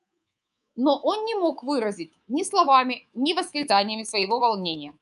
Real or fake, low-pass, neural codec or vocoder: fake; 10.8 kHz; codec, 24 kHz, 3.1 kbps, DualCodec